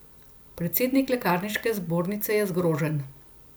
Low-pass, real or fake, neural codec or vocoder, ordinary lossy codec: none; real; none; none